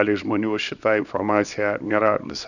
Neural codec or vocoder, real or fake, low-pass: codec, 24 kHz, 0.9 kbps, WavTokenizer, small release; fake; 7.2 kHz